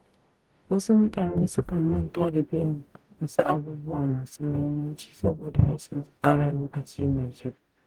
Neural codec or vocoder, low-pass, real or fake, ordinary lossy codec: codec, 44.1 kHz, 0.9 kbps, DAC; 19.8 kHz; fake; Opus, 24 kbps